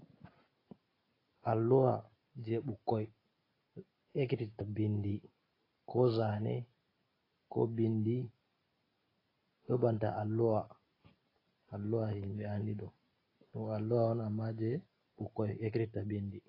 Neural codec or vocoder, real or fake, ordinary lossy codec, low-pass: none; real; AAC, 24 kbps; 5.4 kHz